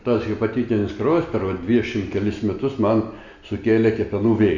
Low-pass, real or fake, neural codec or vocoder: 7.2 kHz; real; none